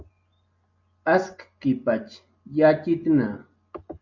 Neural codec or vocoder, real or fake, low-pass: none; real; 7.2 kHz